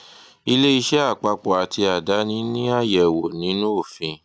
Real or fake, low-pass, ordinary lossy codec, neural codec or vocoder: real; none; none; none